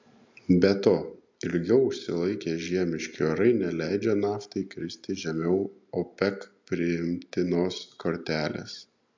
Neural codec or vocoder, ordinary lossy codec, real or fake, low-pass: none; MP3, 64 kbps; real; 7.2 kHz